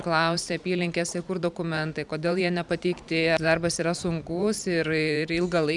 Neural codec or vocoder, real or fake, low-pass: vocoder, 44.1 kHz, 128 mel bands every 512 samples, BigVGAN v2; fake; 10.8 kHz